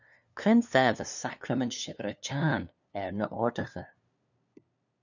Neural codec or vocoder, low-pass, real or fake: codec, 16 kHz, 2 kbps, FunCodec, trained on LibriTTS, 25 frames a second; 7.2 kHz; fake